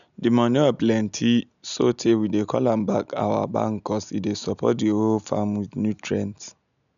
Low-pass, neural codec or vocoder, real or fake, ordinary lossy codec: 7.2 kHz; none; real; none